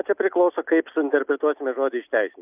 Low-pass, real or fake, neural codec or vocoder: 3.6 kHz; real; none